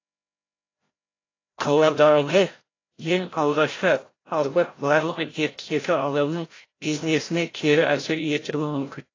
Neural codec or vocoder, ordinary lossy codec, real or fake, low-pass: codec, 16 kHz, 0.5 kbps, FreqCodec, larger model; AAC, 32 kbps; fake; 7.2 kHz